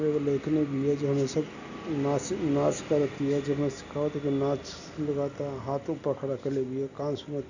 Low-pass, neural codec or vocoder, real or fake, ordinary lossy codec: 7.2 kHz; none; real; none